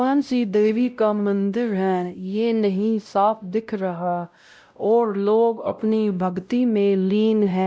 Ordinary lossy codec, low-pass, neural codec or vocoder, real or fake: none; none; codec, 16 kHz, 0.5 kbps, X-Codec, WavLM features, trained on Multilingual LibriSpeech; fake